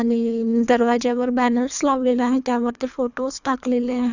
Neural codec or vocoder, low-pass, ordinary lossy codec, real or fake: codec, 24 kHz, 3 kbps, HILCodec; 7.2 kHz; none; fake